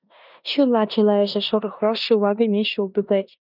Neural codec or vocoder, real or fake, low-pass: codec, 16 kHz in and 24 kHz out, 0.9 kbps, LongCat-Audio-Codec, four codebook decoder; fake; 5.4 kHz